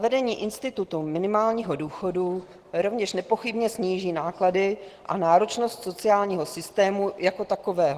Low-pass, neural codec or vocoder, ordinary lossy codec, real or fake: 14.4 kHz; none; Opus, 16 kbps; real